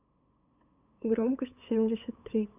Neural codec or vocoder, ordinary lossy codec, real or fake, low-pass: codec, 16 kHz, 8 kbps, FunCodec, trained on LibriTTS, 25 frames a second; none; fake; 3.6 kHz